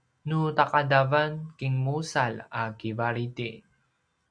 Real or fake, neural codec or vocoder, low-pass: real; none; 9.9 kHz